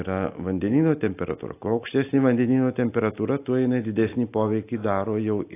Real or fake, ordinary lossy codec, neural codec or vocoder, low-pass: real; AAC, 24 kbps; none; 3.6 kHz